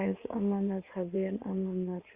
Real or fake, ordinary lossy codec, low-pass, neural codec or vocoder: fake; Opus, 64 kbps; 3.6 kHz; codec, 16 kHz in and 24 kHz out, 2.2 kbps, FireRedTTS-2 codec